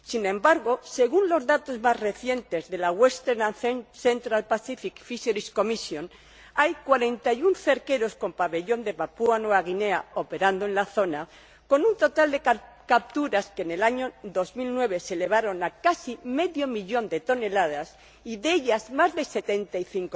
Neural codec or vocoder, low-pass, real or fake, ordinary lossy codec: none; none; real; none